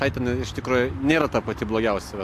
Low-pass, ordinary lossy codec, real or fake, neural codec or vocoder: 14.4 kHz; AAC, 64 kbps; real; none